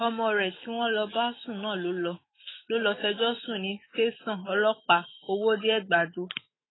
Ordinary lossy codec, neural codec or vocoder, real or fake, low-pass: AAC, 16 kbps; autoencoder, 48 kHz, 128 numbers a frame, DAC-VAE, trained on Japanese speech; fake; 7.2 kHz